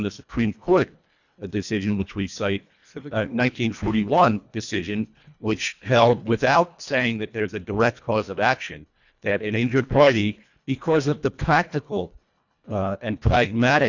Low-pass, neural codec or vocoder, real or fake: 7.2 kHz; codec, 24 kHz, 1.5 kbps, HILCodec; fake